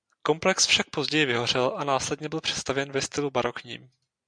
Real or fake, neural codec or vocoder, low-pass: real; none; 9.9 kHz